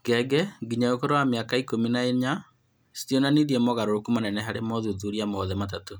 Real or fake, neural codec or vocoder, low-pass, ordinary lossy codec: real; none; none; none